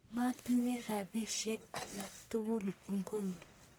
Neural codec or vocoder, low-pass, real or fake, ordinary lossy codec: codec, 44.1 kHz, 1.7 kbps, Pupu-Codec; none; fake; none